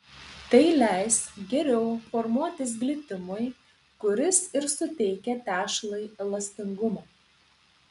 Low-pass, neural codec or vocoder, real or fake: 10.8 kHz; none; real